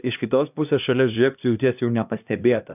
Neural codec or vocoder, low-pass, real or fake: codec, 16 kHz, 1 kbps, X-Codec, HuBERT features, trained on LibriSpeech; 3.6 kHz; fake